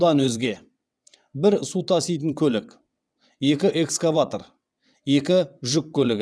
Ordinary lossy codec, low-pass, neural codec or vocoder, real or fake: none; none; vocoder, 22.05 kHz, 80 mel bands, WaveNeXt; fake